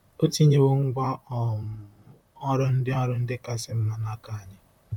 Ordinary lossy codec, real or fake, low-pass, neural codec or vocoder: none; fake; 19.8 kHz; vocoder, 44.1 kHz, 128 mel bands, Pupu-Vocoder